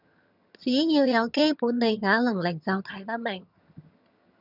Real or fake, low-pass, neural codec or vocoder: fake; 5.4 kHz; vocoder, 22.05 kHz, 80 mel bands, HiFi-GAN